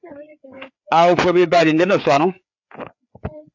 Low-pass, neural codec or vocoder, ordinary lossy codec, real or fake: 7.2 kHz; codec, 16 kHz, 4 kbps, FreqCodec, larger model; AAC, 48 kbps; fake